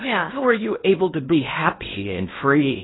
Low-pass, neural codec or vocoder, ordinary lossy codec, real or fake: 7.2 kHz; codec, 16 kHz in and 24 kHz out, 0.6 kbps, FocalCodec, streaming, 2048 codes; AAC, 16 kbps; fake